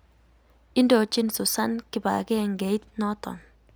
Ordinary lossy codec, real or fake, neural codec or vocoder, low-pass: none; real; none; none